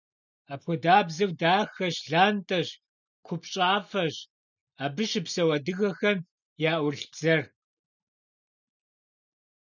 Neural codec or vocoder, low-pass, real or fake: none; 7.2 kHz; real